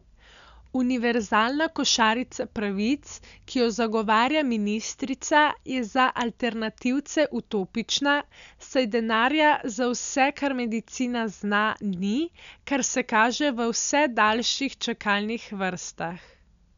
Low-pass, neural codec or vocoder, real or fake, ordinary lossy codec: 7.2 kHz; none; real; none